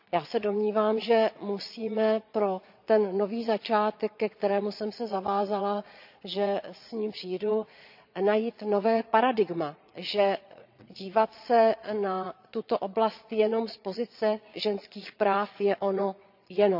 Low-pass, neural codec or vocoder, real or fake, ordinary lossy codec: 5.4 kHz; vocoder, 22.05 kHz, 80 mel bands, Vocos; fake; none